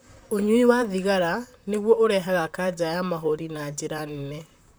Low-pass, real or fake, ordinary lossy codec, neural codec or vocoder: none; fake; none; vocoder, 44.1 kHz, 128 mel bands, Pupu-Vocoder